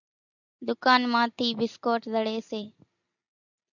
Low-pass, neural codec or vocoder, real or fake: 7.2 kHz; none; real